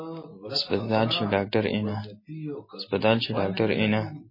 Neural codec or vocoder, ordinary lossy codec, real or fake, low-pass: none; MP3, 24 kbps; real; 5.4 kHz